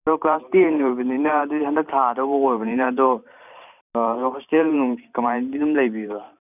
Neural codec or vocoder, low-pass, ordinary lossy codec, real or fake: vocoder, 44.1 kHz, 128 mel bands every 512 samples, BigVGAN v2; 3.6 kHz; none; fake